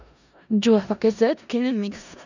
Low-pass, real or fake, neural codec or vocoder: 7.2 kHz; fake; codec, 16 kHz in and 24 kHz out, 0.4 kbps, LongCat-Audio-Codec, four codebook decoder